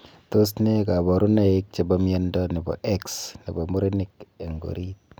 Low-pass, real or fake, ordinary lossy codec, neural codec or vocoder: none; real; none; none